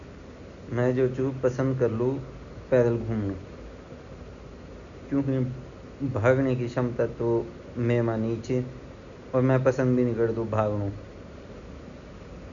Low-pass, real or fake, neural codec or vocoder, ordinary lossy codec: 7.2 kHz; real; none; none